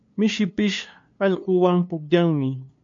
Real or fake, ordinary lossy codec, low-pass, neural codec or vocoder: fake; MP3, 48 kbps; 7.2 kHz; codec, 16 kHz, 2 kbps, FunCodec, trained on LibriTTS, 25 frames a second